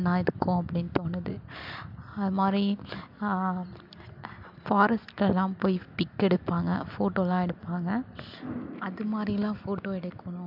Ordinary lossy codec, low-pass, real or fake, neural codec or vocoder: MP3, 48 kbps; 5.4 kHz; real; none